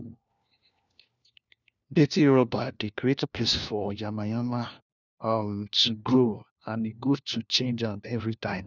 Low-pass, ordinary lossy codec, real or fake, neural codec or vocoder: 7.2 kHz; none; fake; codec, 16 kHz, 1 kbps, FunCodec, trained on LibriTTS, 50 frames a second